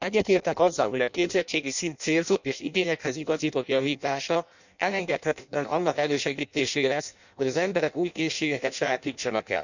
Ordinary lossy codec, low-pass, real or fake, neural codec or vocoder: none; 7.2 kHz; fake; codec, 16 kHz in and 24 kHz out, 0.6 kbps, FireRedTTS-2 codec